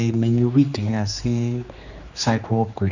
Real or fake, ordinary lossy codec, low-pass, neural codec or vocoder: fake; none; 7.2 kHz; codec, 16 kHz, 2 kbps, X-Codec, HuBERT features, trained on general audio